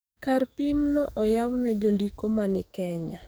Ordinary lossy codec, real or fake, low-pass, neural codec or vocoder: none; fake; none; codec, 44.1 kHz, 2.6 kbps, SNAC